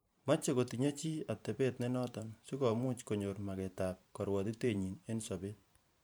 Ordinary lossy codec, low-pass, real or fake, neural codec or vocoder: none; none; fake; vocoder, 44.1 kHz, 128 mel bands every 512 samples, BigVGAN v2